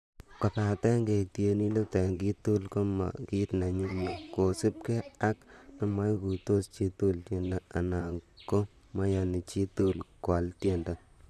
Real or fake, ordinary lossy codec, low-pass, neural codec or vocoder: fake; none; 14.4 kHz; vocoder, 44.1 kHz, 128 mel bands, Pupu-Vocoder